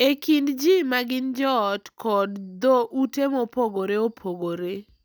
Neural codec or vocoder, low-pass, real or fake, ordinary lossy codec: none; none; real; none